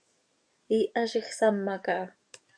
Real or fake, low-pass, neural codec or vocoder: fake; 9.9 kHz; codec, 44.1 kHz, 7.8 kbps, DAC